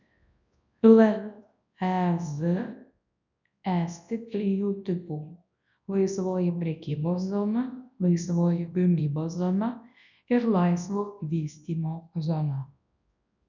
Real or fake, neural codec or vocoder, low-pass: fake; codec, 24 kHz, 0.9 kbps, WavTokenizer, large speech release; 7.2 kHz